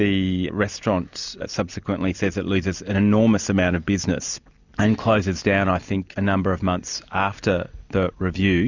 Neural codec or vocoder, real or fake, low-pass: none; real; 7.2 kHz